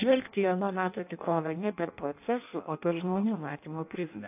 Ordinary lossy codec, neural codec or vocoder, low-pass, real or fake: AAC, 32 kbps; codec, 16 kHz in and 24 kHz out, 0.6 kbps, FireRedTTS-2 codec; 3.6 kHz; fake